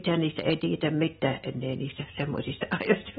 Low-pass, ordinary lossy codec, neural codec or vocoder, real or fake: 7.2 kHz; AAC, 16 kbps; none; real